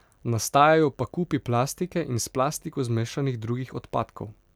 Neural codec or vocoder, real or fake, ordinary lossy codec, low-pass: none; real; none; 19.8 kHz